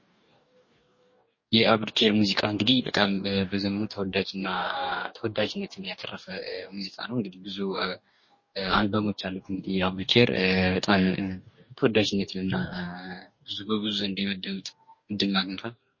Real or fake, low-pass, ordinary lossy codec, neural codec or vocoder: fake; 7.2 kHz; MP3, 32 kbps; codec, 44.1 kHz, 2.6 kbps, DAC